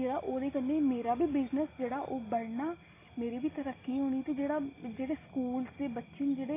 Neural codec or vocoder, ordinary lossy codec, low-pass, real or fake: none; AAC, 16 kbps; 3.6 kHz; real